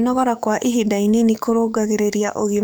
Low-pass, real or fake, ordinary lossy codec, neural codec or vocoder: none; fake; none; codec, 44.1 kHz, 7.8 kbps, DAC